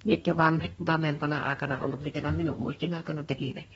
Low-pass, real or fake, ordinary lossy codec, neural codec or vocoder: 14.4 kHz; fake; AAC, 24 kbps; codec, 32 kHz, 1.9 kbps, SNAC